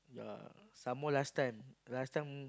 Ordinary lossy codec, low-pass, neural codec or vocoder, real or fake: none; none; none; real